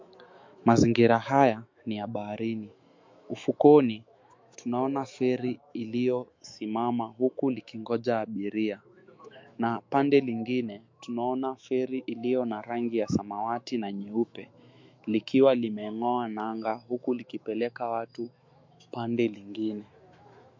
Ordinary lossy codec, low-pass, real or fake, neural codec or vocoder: MP3, 48 kbps; 7.2 kHz; fake; autoencoder, 48 kHz, 128 numbers a frame, DAC-VAE, trained on Japanese speech